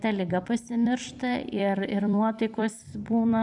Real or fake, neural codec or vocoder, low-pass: fake; vocoder, 44.1 kHz, 128 mel bands every 256 samples, BigVGAN v2; 10.8 kHz